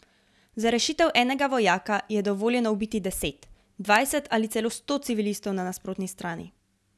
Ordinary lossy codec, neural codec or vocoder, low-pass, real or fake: none; none; none; real